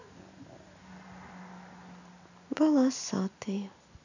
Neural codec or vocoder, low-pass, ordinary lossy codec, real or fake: none; 7.2 kHz; none; real